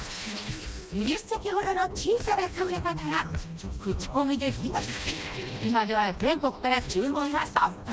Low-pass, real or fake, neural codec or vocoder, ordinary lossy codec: none; fake; codec, 16 kHz, 1 kbps, FreqCodec, smaller model; none